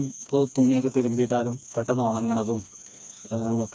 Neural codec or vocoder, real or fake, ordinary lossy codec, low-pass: codec, 16 kHz, 2 kbps, FreqCodec, smaller model; fake; none; none